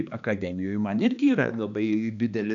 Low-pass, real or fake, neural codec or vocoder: 7.2 kHz; fake; codec, 16 kHz, 2 kbps, X-Codec, HuBERT features, trained on balanced general audio